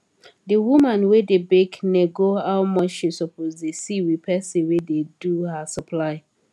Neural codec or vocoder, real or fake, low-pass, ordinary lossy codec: none; real; none; none